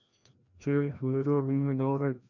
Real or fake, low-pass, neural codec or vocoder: fake; 7.2 kHz; codec, 16 kHz, 1 kbps, FreqCodec, larger model